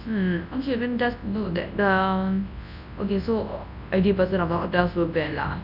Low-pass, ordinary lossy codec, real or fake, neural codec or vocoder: 5.4 kHz; none; fake; codec, 24 kHz, 0.9 kbps, WavTokenizer, large speech release